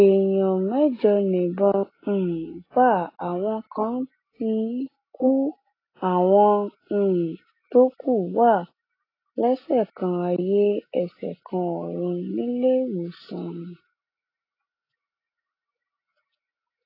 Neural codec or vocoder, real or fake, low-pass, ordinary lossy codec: none; real; 5.4 kHz; AAC, 24 kbps